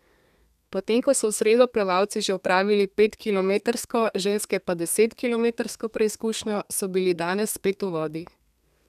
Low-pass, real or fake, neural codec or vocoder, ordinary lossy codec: 14.4 kHz; fake; codec, 32 kHz, 1.9 kbps, SNAC; none